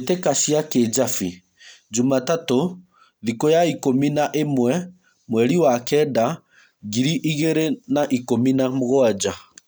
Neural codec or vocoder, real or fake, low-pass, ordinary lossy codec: none; real; none; none